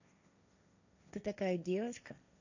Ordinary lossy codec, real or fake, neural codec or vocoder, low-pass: none; fake; codec, 16 kHz, 1.1 kbps, Voila-Tokenizer; 7.2 kHz